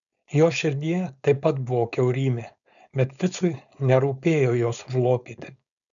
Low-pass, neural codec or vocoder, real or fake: 7.2 kHz; codec, 16 kHz, 4.8 kbps, FACodec; fake